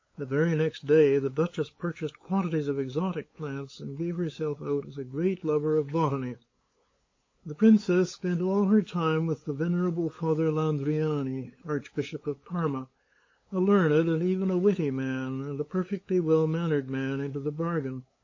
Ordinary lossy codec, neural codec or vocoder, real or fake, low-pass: MP3, 32 kbps; codec, 16 kHz, 8 kbps, FunCodec, trained on LibriTTS, 25 frames a second; fake; 7.2 kHz